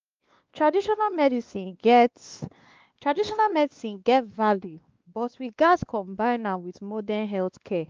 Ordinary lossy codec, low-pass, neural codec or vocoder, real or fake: Opus, 24 kbps; 7.2 kHz; codec, 16 kHz, 2 kbps, X-Codec, WavLM features, trained on Multilingual LibriSpeech; fake